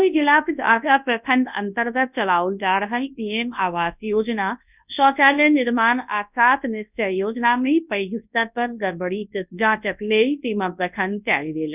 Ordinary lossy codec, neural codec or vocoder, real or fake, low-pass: none; codec, 24 kHz, 0.9 kbps, WavTokenizer, large speech release; fake; 3.6 kHz